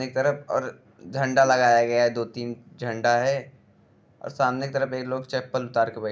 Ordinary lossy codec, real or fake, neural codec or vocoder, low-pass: none; real; none; none